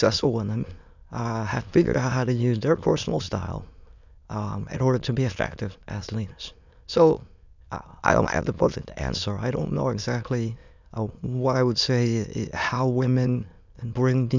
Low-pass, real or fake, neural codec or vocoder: 7.2 kHz; fake; autoencoder, 22.05 kHz, a latent of 192 numbers a frame, VITS, trained on many speakers